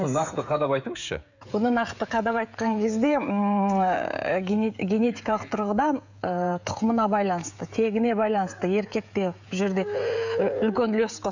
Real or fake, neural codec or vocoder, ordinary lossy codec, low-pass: fake; codec, 16 kHz, 16 kbps, FreqCodec, smaller model; none; 7.2 kHz